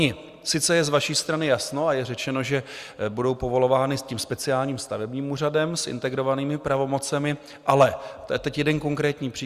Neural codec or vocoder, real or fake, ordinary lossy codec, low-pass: none; real; Opus, 64 kbps; 14.4 kHz